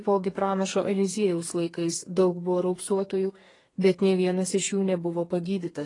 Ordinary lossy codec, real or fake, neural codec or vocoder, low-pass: AAC, 32 kbps; fake; codec, 32 kHz, 1.9 kbps, SNAC; 10.8 kHz